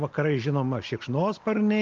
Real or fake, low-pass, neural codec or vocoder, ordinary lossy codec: real; 7.2 kHz; none; Opus, 32 kbps